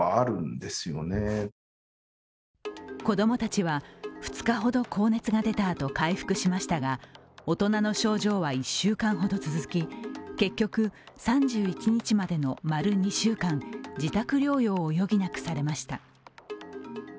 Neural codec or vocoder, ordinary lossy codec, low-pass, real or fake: none; none; none; real